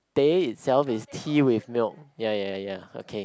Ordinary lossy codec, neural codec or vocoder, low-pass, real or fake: none; none; none; real